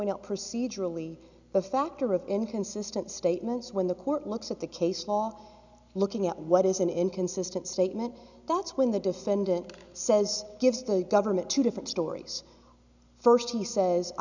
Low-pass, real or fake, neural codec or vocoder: 7.2 kHz; real; none